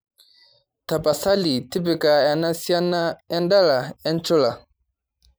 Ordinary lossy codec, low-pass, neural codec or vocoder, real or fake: none; none; vocoder, 44.1 kHz, 128 mel bands every 512 samples, BigVGAN v2; fake